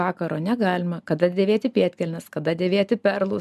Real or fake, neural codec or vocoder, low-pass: real; none; 14.4 kHz